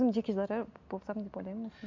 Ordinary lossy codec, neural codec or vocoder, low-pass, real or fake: AAC, 48 kbps; none; 7.2 kHz; real